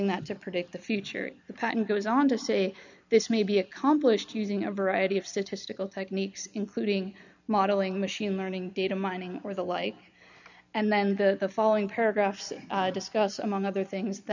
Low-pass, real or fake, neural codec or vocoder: 7.2 kHz; real; none